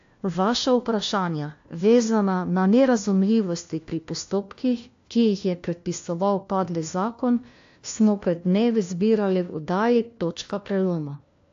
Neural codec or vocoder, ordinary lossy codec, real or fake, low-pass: codec, 16 kHz, 1 kbps, FunCodec, trained on LibriTTS, 50 frames a second; AAC, 48 kbps; fake; 7.2 kHz